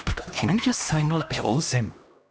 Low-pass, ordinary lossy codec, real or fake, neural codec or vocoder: none; none; fake; codec, 16 kHz, 1 kbps, X-Codec, HuBERT features, trained on LibriSpeech